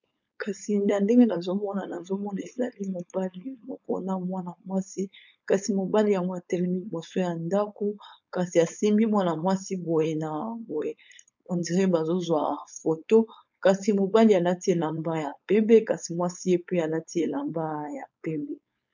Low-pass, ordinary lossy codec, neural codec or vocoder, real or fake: 7.2 kHz; MP3, 64 kbps; codec, 16 kHz, 4.8 kbps, FACodec; fake